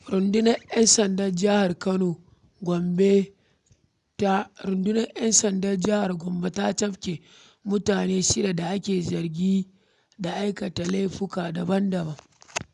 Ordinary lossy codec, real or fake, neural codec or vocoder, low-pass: none; real; none; 10.8 kHz